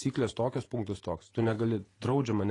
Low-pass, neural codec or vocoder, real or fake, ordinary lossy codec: 10.8 kHz; vocoder, 48 kHz, 128 mel bands, Vocos; fake; AAC, 32 kbps